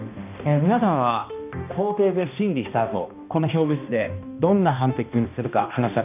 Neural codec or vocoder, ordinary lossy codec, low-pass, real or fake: codec, 16 kHz, 1 kbps, X-Codec, HuBERT features, trained on balanced general audio; none; 3.6 kHz; fake